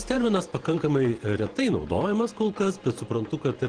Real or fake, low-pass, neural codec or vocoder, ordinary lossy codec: fake; 9.9 kHz; vocoder, 48 kHz, 128 mel bands, Vocos; Opus, 16 kbps